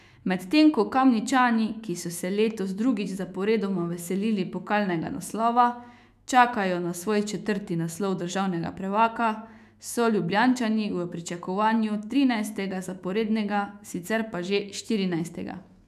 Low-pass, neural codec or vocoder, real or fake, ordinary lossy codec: 14.4 kHz; autoencoder, 48 kHz, 128 numbers a frame, DAC-VAE, trained on Japanese speech; fake; none